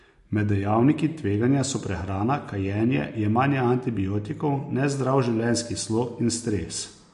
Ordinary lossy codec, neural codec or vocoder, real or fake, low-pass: MP3, 48 kbps; none; real; 14.4 kHz